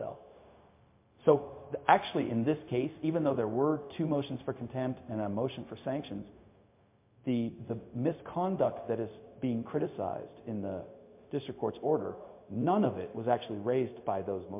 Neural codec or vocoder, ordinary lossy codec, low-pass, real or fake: codec, 16 kHz, 0.4 kbps, LongCat-Audio-Codec; MP3, 24 kbps; 3.6 kHz; fake